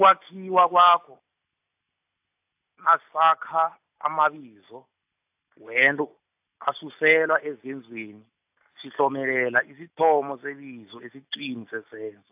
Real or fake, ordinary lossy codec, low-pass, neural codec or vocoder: real; AAC, 32 kbps; 3.6 kHz; none